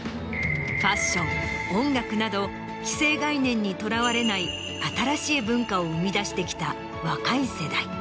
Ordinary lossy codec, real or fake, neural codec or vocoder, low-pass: none; real; none; none